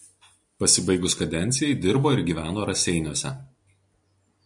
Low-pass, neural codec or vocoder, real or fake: 10.8 kHz; none; real